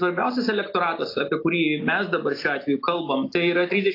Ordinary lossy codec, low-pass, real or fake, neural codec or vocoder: AAC, 32 kbps; 5.4 kHz; real; none